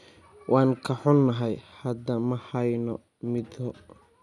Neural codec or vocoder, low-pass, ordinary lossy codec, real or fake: none; none; none; real